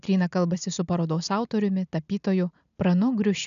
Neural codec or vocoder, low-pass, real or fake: none; 7.2 kHz; real